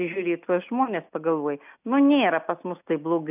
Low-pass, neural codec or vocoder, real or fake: 3.6 kHz; none; real